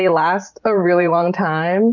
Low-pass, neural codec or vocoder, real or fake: 7.2 kHz; vocoder, 44.1 kHz, 128 mel bands every 512 samples, BigVGAN v2; fake